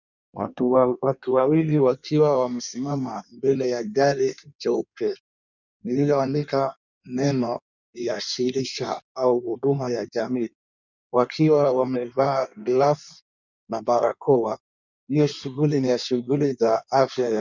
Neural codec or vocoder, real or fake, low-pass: codec, 16 kHz in and 24 kHz out, 1.1 kbps, FireRedTTS-2 codec; fake; 7.2 kHz